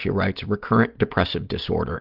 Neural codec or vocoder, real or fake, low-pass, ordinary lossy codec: none; real; 5.4 kHz; Opus, 24 kbps